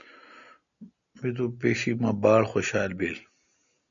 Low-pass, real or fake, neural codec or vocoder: 7.2 kHz; real; none